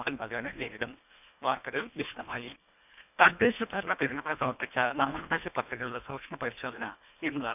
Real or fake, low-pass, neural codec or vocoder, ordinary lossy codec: fake; 3.6 kHz; codec, 24 kHz, 1.5 kbps, HILCodec; none